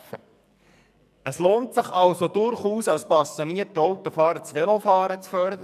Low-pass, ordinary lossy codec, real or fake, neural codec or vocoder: 14.4 kHz; none; fake; codec, 44.1 kHz, 2.6 kbps, SNAC